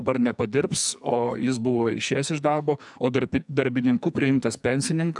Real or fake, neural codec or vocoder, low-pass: fake; codec, 44.1 kHz, 2.6 kbps, SNAC; 10.8 kHz